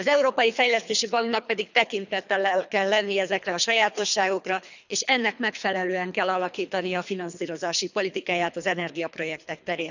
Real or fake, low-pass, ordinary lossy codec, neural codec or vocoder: fake; 7.2 kHz; none; codec, 24 kHz, 3 kbps, HILCodec